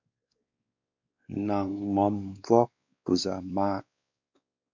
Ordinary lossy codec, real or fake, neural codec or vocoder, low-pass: MP3, 64 kbps; fake; codec, 16 kHz, 2 kbps, X-Codec, WavLM features, trained on Multilingual LibriSpeech; 7.2 kHz